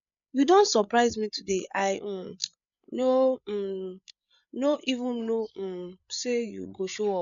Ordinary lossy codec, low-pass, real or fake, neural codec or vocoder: none; 7.2 kHz; fake; codec, 16 kHz, 8 kbps, FreqCodec, larger model